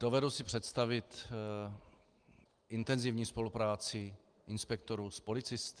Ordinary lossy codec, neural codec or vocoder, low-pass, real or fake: Opus, 24 kbps; none; 9.9 kHz; real